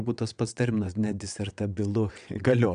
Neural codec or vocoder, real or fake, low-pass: vocoder, 22.05 kHz, 80 mel bands, WaveNeXt; fake; 9.9 kHz